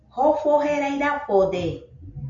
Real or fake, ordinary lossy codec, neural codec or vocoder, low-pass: real; MP3, 48 kbps; none; 7.2 kHz